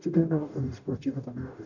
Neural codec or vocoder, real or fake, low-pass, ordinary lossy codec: codec, 44.1 kHz, 0.9 kbps, DAC; fake; 7.2 kHz; none